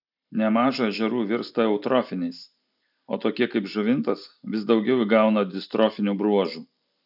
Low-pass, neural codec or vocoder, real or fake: 5.4 kHz; none; real